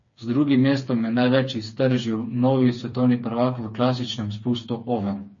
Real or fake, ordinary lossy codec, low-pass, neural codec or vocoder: fake; MP3, 32 kbps; 7.2 kHz; codec, 16 kHz, 4 kbps, FreqCodec, smaller model